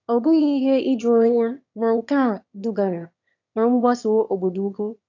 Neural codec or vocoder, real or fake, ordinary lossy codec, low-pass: autoencoder, 22.05 kHz, a latent of 192 numbers a frame, VITS, trained on one speaker; fake; AAC, 48 kbps; 7.2 kHz